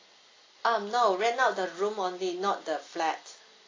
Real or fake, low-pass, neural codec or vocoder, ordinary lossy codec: real; 7.2 kHz; none; AAC, 32 kbps